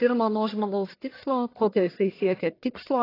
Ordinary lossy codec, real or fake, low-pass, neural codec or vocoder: AAC, 24 kbps; fake; 5.4 kHz; codec, 44.1 kHz, 1.7 kbps, Pupu-Codec